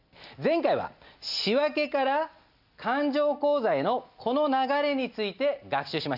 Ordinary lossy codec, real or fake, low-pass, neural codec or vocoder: none; real; 5.4 kHz; none